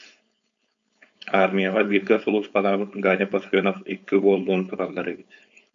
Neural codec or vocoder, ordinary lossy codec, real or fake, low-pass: codec, 16 kHz, 4.8 kbps, FACodec; AAC, 64 kbps; fake; 7.2 kHz